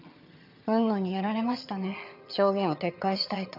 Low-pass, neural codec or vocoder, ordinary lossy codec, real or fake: 5.4 kHz; vocoder, 22.05 kHz, 80 mel bands, HiFi-GAN; none; fake